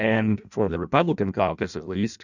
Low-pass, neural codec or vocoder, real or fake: 7.2 kHz; codec, 16 kHz in and 24 kHz out, 0.6 kbps, FireRedTTS-2 codec; fake